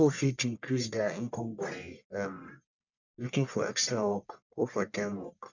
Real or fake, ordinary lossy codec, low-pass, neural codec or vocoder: fake; none; 7.2 kHz; codec, 44.1 kHz, 1.7 kbps, Pupu-Codec